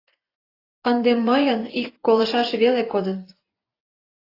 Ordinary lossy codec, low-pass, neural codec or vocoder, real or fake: AAC, 24 kbps; 5.4 kHz; vocoder, 24 kHz, 100 mel bands, Vocos; fake